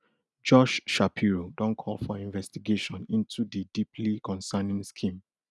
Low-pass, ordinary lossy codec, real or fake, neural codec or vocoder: none; none; real; none